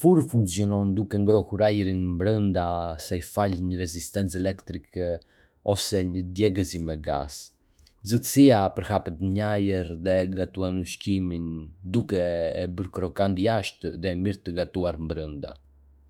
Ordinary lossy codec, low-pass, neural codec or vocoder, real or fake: none; 19.8 kHz; autoencoder, 48 kHz, 32 numbers a frame, DAC-VAE, trained on Japanese speech; fake